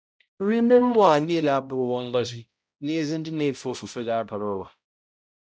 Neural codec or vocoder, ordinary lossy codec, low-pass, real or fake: codec, 16 kHz, 0.5 kbps, X-Codec, HuBERT features, trained on balanced general audio; none; none; fake